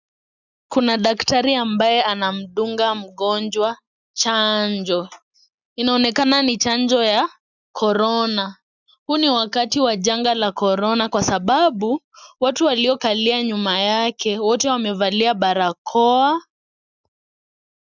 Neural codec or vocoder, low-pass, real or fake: none; 7.2 kHz; real